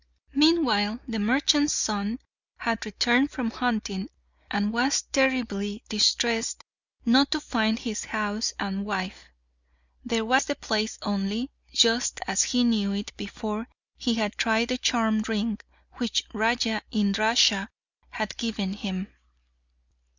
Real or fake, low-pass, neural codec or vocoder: real; 7.2 kHz; none